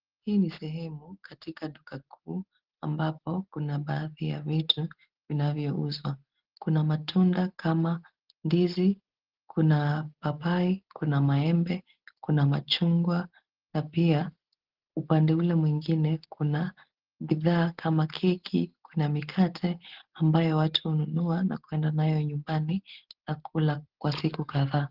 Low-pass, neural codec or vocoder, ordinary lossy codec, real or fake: 5.4 kHz; none; Opus, 16 kbps; real